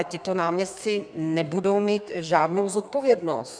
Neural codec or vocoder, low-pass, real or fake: codec, 32 kHz, 1.9 kbps, SNAC; 9.9 kHz; fake